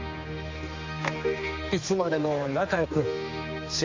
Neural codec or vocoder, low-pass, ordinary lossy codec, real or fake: codec, 16 kHz, 2 kbps, X-Codec, HuBERT features, trained on general audio; 7.2 kHz; AAC, 48 kbps; fake